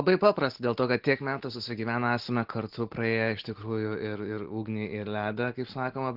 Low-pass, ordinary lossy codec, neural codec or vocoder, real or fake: 5.4 kHz; Opus, 16 kbps; none; real